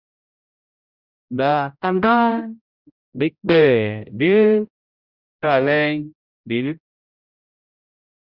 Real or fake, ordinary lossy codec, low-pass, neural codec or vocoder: fake; Opus, 64 kbps; 5.4 kHz; codec, 16 kHz, 0.5 kbps, X-Codec, HuBERT features, trained on general audio